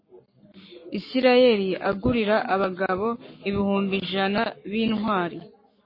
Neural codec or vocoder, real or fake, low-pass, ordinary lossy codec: codec, 44.1 kHz, 7.8 kbps, Pupu-Codec; fake; 5.4 kHz; MP3, 24 kbps